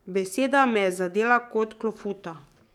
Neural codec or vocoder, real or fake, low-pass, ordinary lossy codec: codec, 44.1 kHz, 7.8 kbps, DAC; fake; 19.8 kHz; none